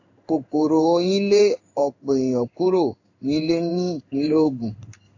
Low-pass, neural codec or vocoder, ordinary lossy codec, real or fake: 7.2 kHz; codec, 16 kHz in and 24 kHz out, 1 kbps, XY-Tokenizer; AAC, 48 kbps; fake